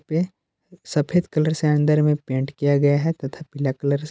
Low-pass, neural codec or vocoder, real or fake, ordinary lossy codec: none; none; real; none